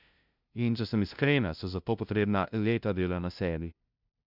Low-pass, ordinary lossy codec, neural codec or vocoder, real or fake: 5.4 kHz; none; codec, 16 kHz, 0.5 kbps, FunCodec, trained on LibriTTS, 25 frames a second; fake